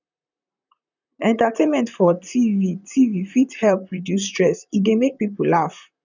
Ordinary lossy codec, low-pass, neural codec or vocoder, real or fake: none; 7.2 kHz; vocoder, 44.1 kHz, 128 mel bands, Pupu-Vocoder; fake